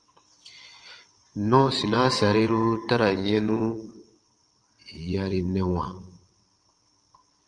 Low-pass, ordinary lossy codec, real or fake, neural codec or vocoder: 9.9 kHz; Opus, 32 kbps; fake; vocoder, 22.05 kHz, 80 mel bands, WaveNeXt